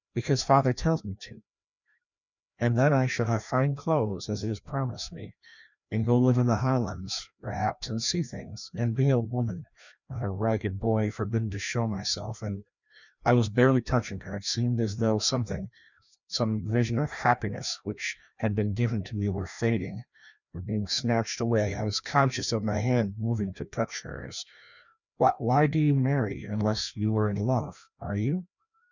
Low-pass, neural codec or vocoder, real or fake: 7.2 kHz; codec, 16 kHz, 1 kbps, FreqCodec, larger model; fake